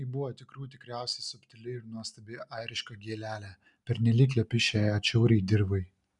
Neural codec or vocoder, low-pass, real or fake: none; 10.8 kHz; real